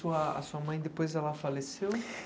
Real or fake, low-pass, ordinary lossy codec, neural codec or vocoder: real; none; none; none